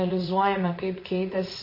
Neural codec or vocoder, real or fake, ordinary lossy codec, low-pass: vocoder, 22.05 kHz, 80 mel bands, Vocos; fake; MP3, 24 kbps; 5.4 kHz